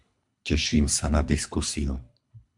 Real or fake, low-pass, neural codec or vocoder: fake; 10.8 kHz; codec, 24 kHz, 3 kbps, HILCodec